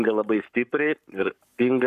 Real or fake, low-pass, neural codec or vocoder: fake; 14.4 kHz; codec, 44.1 kHz, 7.8 kbps, Pupu-Codec